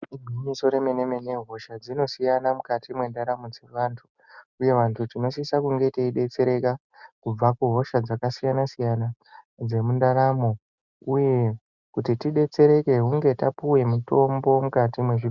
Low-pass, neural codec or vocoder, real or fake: 7.2 kHz; none; real